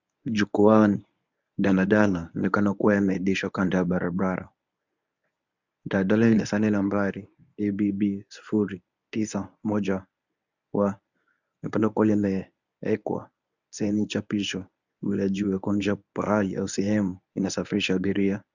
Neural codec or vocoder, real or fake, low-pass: codec, 24 kHz, 0.9 kbps, WavTokenizer, medium speech release version 1; fake; 7.2 kHz